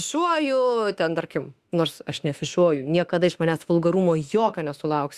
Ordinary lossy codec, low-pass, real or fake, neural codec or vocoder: Opus, 64 kbps; 14.4 kHz; fake; autoencoder, 48 kHz, 32 numbers a frame, DAC-VAE, trained on Japanese speech